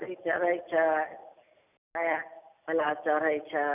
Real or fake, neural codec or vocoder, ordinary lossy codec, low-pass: real; none; none; 3.6 kHz